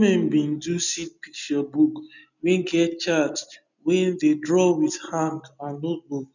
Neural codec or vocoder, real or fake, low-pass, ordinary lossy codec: none; real; 7.2 kHz; none